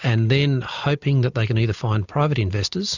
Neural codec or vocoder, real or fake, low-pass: none; real; 7.2 kHz